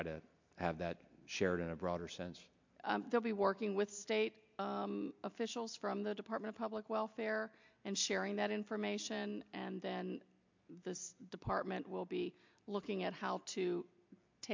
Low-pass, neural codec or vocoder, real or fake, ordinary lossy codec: 7.2 kHz; none; real; MP3, 64 kbps